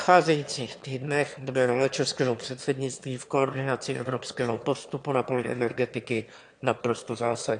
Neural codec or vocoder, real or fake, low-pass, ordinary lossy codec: autoencoder, 22.05 kHz, a latent of 192 numbers a frame, VITS, trained on one speaker; fake; 9.9 kHz; AAC, 64 kbps